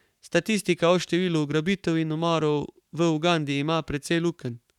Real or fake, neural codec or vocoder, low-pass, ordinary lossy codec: fake; codec, 44.1 kHz, 7.8 kbps, Pupu-Codec; 19.8 kHz; none